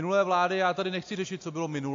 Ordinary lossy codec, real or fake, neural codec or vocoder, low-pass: AAC, 48 kbps; real; none; 7.2 kHz